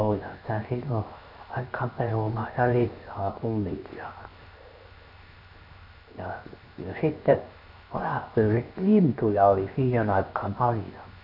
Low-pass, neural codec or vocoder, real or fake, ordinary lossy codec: 5.4 kHz; codec, 16 kHz, 0.7 kbps, FocalCodec; fake; none